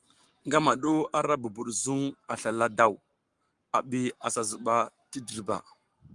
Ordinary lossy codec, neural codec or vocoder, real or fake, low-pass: Opus, 32 kbps; codec, 44.1 kHz, 7.8 kbps, Pupu-Codec; fake; 10.8 kHz